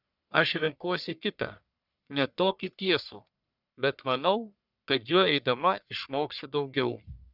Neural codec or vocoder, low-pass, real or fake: codec, 44.1 kHz, 1.7 kbps, Pupu-Codec; 5.4 kHz; fake